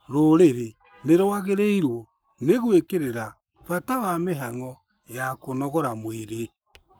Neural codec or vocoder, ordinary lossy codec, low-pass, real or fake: codec, 44.1 kHz, 7.8 kbps, Pupu-Codec; none; none; fake